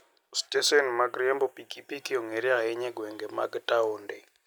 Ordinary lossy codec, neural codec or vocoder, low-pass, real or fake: none; none; none; real